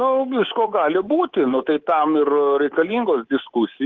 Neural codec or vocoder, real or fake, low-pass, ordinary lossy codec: codec, 24 kHz, 3.1 kbps, DualCodec; fake; 7.2 kHz; Opus, 16 kbps